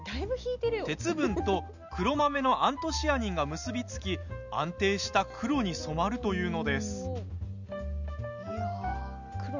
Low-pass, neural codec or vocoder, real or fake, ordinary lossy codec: 7.2 kHz; none; real; none